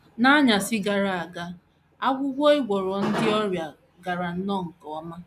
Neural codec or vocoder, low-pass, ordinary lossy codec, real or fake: none; 14.4 kHz; none; real